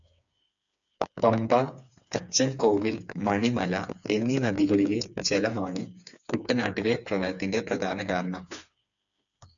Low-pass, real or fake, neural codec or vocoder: 7.2 kHz; fake; codec, 16 kHz, 4 kbps, FreqCodec, smaller model